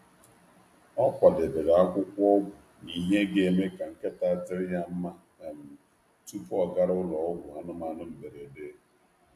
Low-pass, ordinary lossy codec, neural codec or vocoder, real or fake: 14.4 kHz; AAC, 64 kbps; none; real